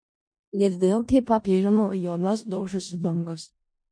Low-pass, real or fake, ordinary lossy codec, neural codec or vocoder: 9.9 kHz; fake; MP3, 48 kbps; codec, 16 kHz in and 24 kHz out, 0.4 kbps, LongCat-Audio-Codec, four codebook decoder